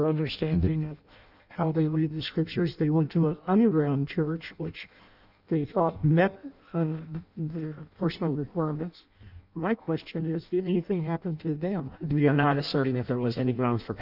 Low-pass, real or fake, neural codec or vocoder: 5.4 kHz; fake; codec, 16 kHz in and 24 kHz out, 0.6 kbps, FireRedTTS-2 codec